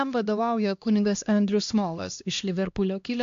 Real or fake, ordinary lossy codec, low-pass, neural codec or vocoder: fake; AAC, 64 kbps; 7.2 kHz; codec, 16 kHz, 1 kbps, X-Codec, HuBERT features, trained on LibriSpeech